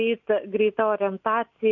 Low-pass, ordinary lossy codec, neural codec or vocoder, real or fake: 7.2 kHz; MP3, 48 kbps; none; real